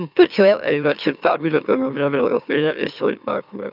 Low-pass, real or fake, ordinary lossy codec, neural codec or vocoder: 5.4 kHz; fake; none; autoencoder, 44.1 kHz, a latent of 192 numbers a frame, MeloTTS